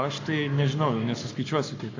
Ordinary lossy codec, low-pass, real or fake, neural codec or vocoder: AAC, 48 kbps; 7.2 kHz; fake; codec, 44.1 kHz, 7.8 kbps, Pupu-Codec